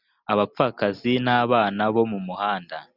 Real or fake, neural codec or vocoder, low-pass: real; none; 5.4 kHz